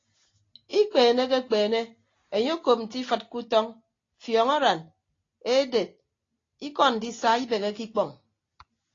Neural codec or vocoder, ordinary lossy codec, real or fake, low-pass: none; AAC, 32 kbps; real; 7.2 kHz